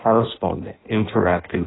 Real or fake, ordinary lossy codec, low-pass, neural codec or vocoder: fake; AAC, 16 kbps; 7.2 kHz; codec, 16 kHz in and 24 kHz out, 0.6 kbps, FireRedTTS-2 codec